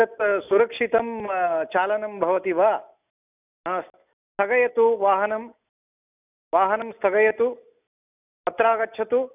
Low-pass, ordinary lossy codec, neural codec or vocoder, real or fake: 3.6 kHz; none; none; real